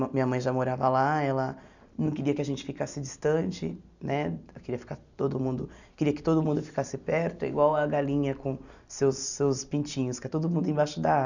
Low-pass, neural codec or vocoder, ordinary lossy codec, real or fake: 7.2 kHz; none; none; real